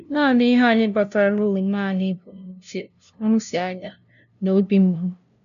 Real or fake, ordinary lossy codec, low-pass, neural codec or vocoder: fake; none; 7.2 kHz; codec, 16 kHz, 0.5 kbps, FunCodec, trained on LibriTTS, 25 frames a second